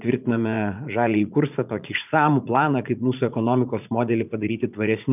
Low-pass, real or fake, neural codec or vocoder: 3.6 kHz; fake; vocoder, 44.1 kHz, 80 mel bands, Vocos